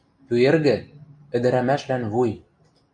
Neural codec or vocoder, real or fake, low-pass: none; real; 9.9 kHz